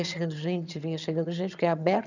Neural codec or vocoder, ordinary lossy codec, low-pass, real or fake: vocoder, 22.05 kHz, 80 mel bands, HiFi-GAN; none; 7.2 kHz; fake